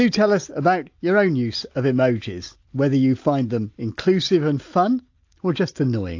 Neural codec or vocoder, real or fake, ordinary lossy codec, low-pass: none; real; AAC, 48 kbps; 7.2 kHz